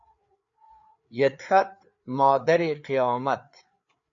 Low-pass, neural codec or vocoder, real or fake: 7.2 kHz; codec, 16 kHz, 4 kbps, FreqCodec, larger model; fake